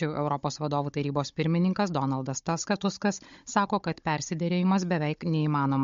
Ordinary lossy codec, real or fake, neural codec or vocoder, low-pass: MP3, 48 kbps; fake; codec, 16 kHz, 16 kbps, FunCodec, trained on Chinese and English, 50 frames a second; 7.2 kHz